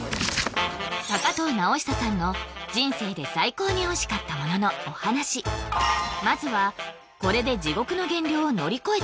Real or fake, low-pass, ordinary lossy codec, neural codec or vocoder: real; none; none; none